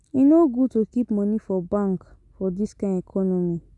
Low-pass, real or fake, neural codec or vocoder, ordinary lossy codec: 10.8 kHz; real; none; MP3, 96 kbps